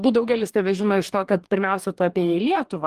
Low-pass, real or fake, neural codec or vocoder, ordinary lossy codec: 14.4 kHz; fake; codec, 44.1 kHz, 2.6 kbps, DAC; Opus, 24 kbps